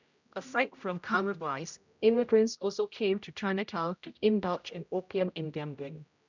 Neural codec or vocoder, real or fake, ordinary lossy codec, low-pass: codec, 16 kHz, 0.5 kbps, X-Codec, HuBERT features, trained on general audio; fake; none; 7.2 kHz